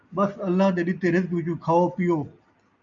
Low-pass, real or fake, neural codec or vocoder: 7.2 kHz; real; none